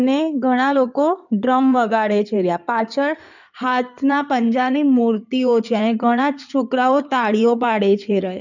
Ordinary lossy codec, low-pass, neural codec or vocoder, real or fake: none; 7.2 kHz; codec, 16 kHz in and 24 kHz out, 2.2 kbps, FireRedTTS-2 codec; fake